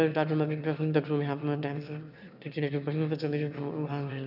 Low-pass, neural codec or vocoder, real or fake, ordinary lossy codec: 5.4 kHz; autoencoder, 22.05 kHz, a latent of 192 numbers a frame, VITS, trained on one speaker; fake; none